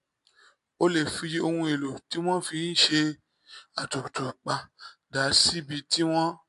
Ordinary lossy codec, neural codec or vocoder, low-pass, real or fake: MP3, 64 kbps; none; 10.8 kHz; real